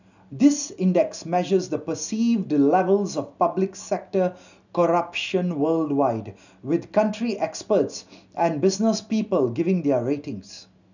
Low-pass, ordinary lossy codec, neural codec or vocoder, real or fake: 7.2 kHz; none; none; real